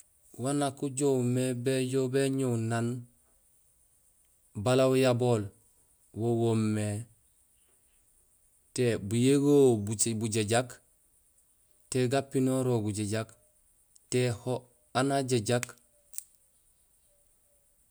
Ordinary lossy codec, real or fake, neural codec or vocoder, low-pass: none; real; none; none